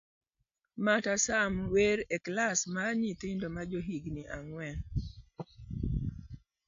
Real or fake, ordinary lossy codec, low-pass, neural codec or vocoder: real; AAC, 64 kbps; 7.2 kHz; none